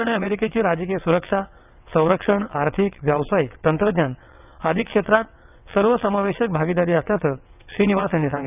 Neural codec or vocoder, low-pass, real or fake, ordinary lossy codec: vocoder, 22.05 kHz, 80 mel bands, WaveNeXt; 3.6 kHz; fake; none